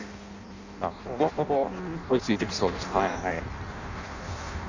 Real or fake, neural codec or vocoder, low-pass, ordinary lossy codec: fake; codec, 16 kHz in and 24 kHz out, 0.6 kbps, FireRedTTS-2 codec; 7.2 kHz; none